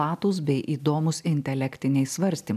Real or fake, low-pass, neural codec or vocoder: real; 14.4 kHz; none